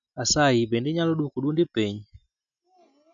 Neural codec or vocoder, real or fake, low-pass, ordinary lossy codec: none; real; 7.2 kHz; MP3, 96 kbps